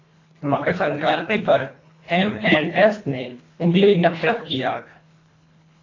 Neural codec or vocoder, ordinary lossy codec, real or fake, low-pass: codec, 24 kHz, 1.5 kbps, HILCodec; AAC, 32 kbps; fake; 7.2 kHz